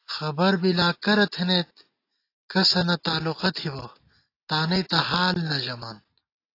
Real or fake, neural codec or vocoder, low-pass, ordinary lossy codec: real; none; 5.4 kHz; AAC, 32 kbps